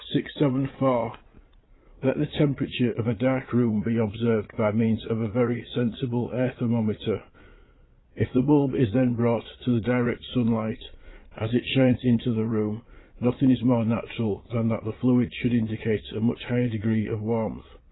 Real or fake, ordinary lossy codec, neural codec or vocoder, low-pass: fake; AAC, 16 kbps; vocoder, 22.05 kHz, 80 mel bands, Vocos; 7.2 kHz